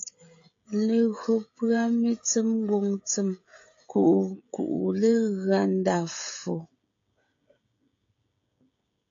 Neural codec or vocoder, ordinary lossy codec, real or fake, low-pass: codec, 16 kHz, 16 kbps, FreqCodec, smaller model; AAC, 48 kbps; fake; 7.2 kHz